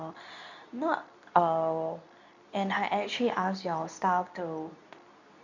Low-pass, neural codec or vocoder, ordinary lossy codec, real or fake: 7.2 kHz; codec, 24 kHz, 0.9 kbps, WavTokenizer, medium speech release version 2; none; fake